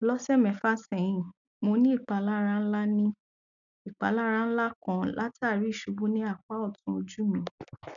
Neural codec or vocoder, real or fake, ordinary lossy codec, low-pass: none; real; none; 7.2 kHz